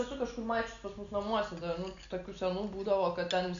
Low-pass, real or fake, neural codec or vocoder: 7.2 kHz; real; none